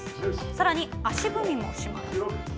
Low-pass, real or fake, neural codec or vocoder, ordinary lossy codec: none; real; none; none